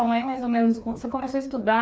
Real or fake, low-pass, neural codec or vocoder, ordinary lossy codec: fake; none; codec, 16 kHz, 2 kbps, FreqCodec, larger model; none